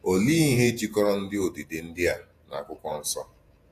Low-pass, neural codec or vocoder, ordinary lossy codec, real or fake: 14.4 kHz; vocoder, 48 kHz, 128 mel bands, Vocos; AAC, 64 kbps; fake